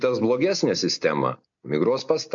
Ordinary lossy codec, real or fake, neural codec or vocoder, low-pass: AAC, 64 kbps; real; none; 7.2 kHz